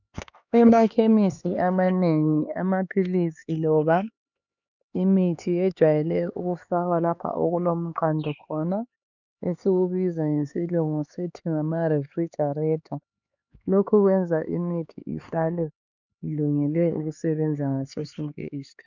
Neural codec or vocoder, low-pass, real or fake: codec, 16 kHz, 4 kbps, X-Codec, HuBERT features, trained on LibriSpeech; 7.2 kHz; fake